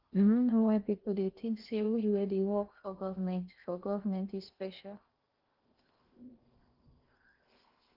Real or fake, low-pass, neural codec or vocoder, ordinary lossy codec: fake; 5.4 kHz; codec, 16 kHz in and 24 kHz out, 0.8 kbps, FocalCodec, streaming, 65536 codes; Opus, 32 kbps